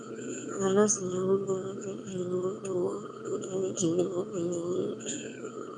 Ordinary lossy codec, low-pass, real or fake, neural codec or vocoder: none; 9.9 kHz; fake; autoencoder, 22.05 kHz, a latent of 192 numbers a frame, VITS, trained on one speaker